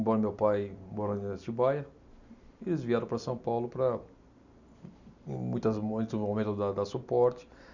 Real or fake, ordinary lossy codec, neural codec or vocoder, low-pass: real; none; none; 7.2 kHz